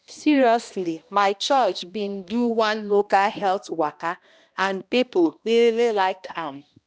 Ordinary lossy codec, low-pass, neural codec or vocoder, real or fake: none; none; codec, 16 kHz, 1 kbps, X-Codec, HuBERT features, trained on balanced general audio; fake